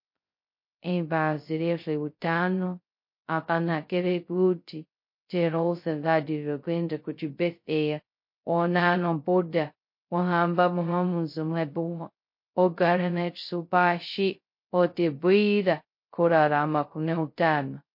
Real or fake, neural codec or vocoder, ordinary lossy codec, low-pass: fake; codec, 16 kHz, 0.2 kbps, FocalCodec; MP3, 32 kbps; 5.4 kHz